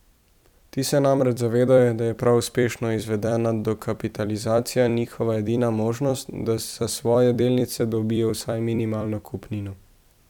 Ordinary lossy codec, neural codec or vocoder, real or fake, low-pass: none; vocoder, 44.1 kHz, 128 mel bands every 256 samples, BigVGAN v2; fake; 19.8 kHz